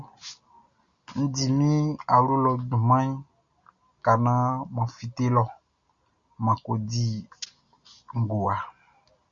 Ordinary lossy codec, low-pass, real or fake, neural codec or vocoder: Opus, 64 kbps; 7.2 kHz; real; none